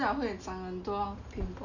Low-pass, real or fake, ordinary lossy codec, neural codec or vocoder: 7.2 kHz; real; none; none